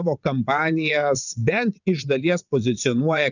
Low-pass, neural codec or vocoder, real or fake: 7.2 kHz; vocoder, 22.05 kHz, 80 mel bands, WaveNeXt; fake